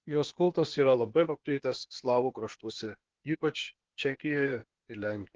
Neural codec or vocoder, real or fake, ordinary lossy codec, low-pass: codec, 16 kHz, 0.8 kbps, ZipCodec; fake; Opus, 16 kbps; 7.2 kHz